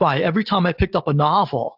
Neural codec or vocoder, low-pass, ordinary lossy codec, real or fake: none; 5.4 kHz; AAC, 48 kbps; real